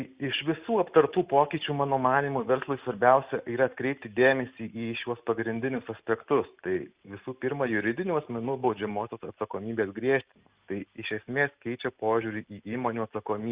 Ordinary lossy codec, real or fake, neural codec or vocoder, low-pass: Opus, 64 kbps; real; none; 3.6 kHz